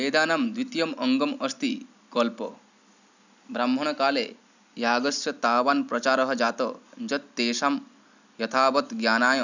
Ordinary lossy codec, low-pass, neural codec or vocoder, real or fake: none; 7.2 kHz; none; real